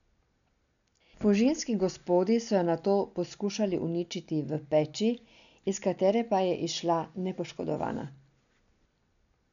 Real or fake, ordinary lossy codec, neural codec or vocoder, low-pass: real; none; none; 7.2 kHz